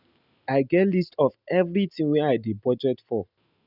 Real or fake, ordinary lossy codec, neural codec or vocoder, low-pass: real; none; none; 5.4 kHz